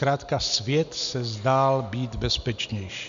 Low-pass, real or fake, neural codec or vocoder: 7.2 kHz; real; none